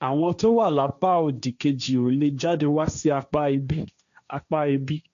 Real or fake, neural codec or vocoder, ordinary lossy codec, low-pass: fake; codec, 16 kHz, 1.1 kbps, Voila-Tokenizer; none; 7.2 kHz